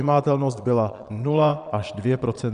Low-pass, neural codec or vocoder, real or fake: 9.9 kHz; vocoder, 22.05 kHz, 80 mel bands, Vocos; fake